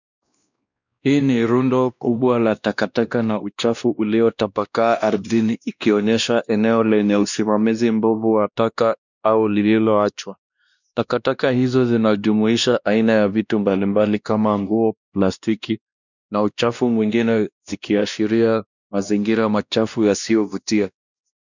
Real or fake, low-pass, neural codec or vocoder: fake; 7.2 kHz; codec, 16 kHz, 1 kbps, X-Codec, WavLM features, trained on Multilingual LibriSpeech